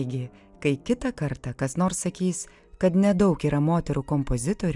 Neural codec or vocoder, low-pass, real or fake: none; 10.8 kHz; real